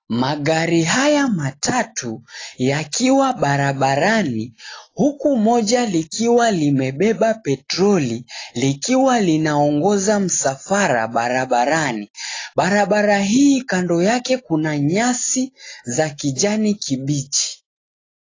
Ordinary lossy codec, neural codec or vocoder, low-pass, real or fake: AAC, 32 kbps; none; 7.2 kHz; real